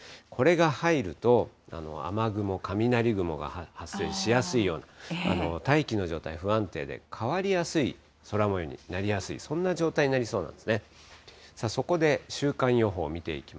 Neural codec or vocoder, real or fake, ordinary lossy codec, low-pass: none; real; none; none